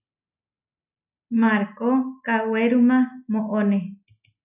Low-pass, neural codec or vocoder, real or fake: 3.6 kHz; none; real